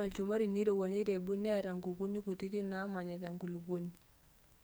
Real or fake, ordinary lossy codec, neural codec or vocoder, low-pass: fake; none; codec, 44.1 kHz, 2.6 kbps, SNAC; none